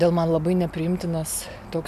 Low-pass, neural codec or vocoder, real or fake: 14.4 kHz; none; real